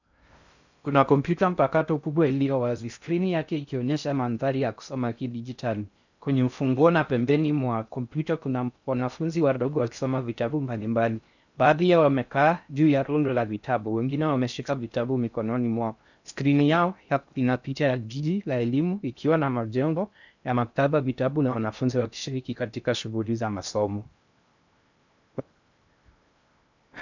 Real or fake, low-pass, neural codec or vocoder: fake; 7.2 kHz; codec, 16 kHz in and 24 kHz out, 0.6 kbps, FocalCodec, streaming, 2048 codes